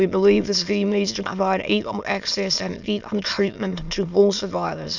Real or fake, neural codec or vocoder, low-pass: fake; autoencoder, 22.05 kHz, a latent of 192 numbers a frame, VITS, trained on many speakers; 7.2 kHz